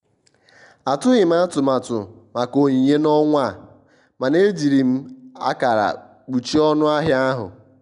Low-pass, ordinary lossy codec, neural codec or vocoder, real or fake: 10.8 kHz; none; none; real